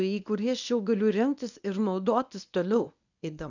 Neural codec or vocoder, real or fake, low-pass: codec, 24 kHz, 0.9 kbps, WavTokenizer, medium speech release version 1; fake; 7.2 kHz